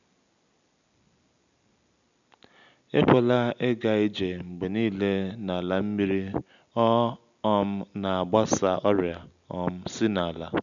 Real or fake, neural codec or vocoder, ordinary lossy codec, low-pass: real; none; none; 7.2 kHz